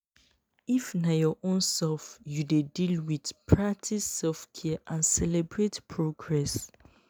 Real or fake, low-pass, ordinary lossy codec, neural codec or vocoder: real; none; none; none